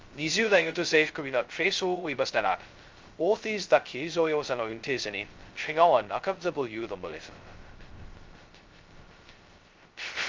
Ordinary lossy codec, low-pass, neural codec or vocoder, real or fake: Opus, 32 kbps; 7.2 kHz; codec, 16 kHz, 0.2 kbps, FocalCodec; fake